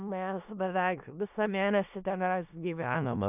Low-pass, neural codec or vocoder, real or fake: 3.6 kHz; codec, 16 kHz in and 24 kHz out, 0.4 kbps, LongCat-Audio-Codec, four codebook decoder; fake